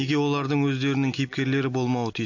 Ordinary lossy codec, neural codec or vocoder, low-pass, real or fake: none; none; 7.2 kHz; real